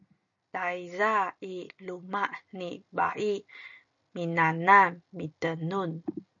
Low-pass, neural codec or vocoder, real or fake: 7.2 kHz; none; real